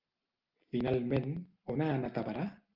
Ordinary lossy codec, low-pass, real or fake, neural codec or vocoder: Opus, 32 kbps; 5.4 kHz; real; none